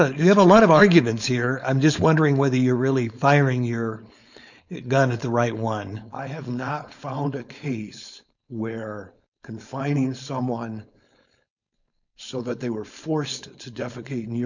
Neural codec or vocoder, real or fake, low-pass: codec, 16 kHz, 4.8 kbps, FACodec; fake; 7.2 kHz